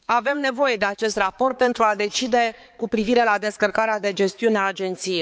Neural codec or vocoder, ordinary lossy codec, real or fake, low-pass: codec, 16 kHz, 4 kbps, X-Codec, HuBERT features, trained on balanced general audio; none; fake; none